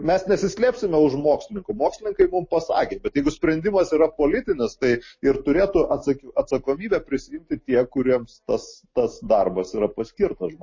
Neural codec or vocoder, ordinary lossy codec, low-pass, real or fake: none; MP3, 32 kbps; 7.2 kHz; real